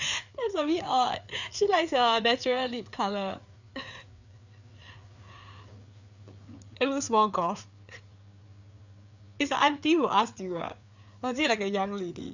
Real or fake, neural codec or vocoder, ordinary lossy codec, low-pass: fake; codec, 16 kHz, 4 kbps, FreqCodec, larger model; none; 7.2 kHz